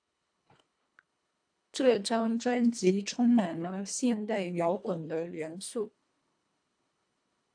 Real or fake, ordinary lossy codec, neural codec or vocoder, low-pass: fake; AAC, 64 kbps; codec, 24 kHz, 1.5 kbps, HILCodec; 9.9 kHz